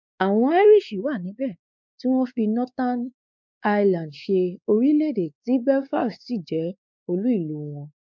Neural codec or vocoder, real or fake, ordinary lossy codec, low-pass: codec, 16 kHz, 4 kbps, X-Codec, WavLM features, trained on Multilingual LibriSpeech; fake; none; 7.2 kHz